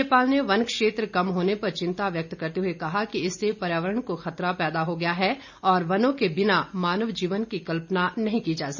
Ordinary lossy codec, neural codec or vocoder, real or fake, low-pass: none; none; real; 7.2 kHz